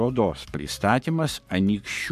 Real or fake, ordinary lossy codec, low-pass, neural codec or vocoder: fake; MP3, 96 kbps; 14.4 kHz; codec, 44.1 kHz, 7.8 kbps, Pupu-Codec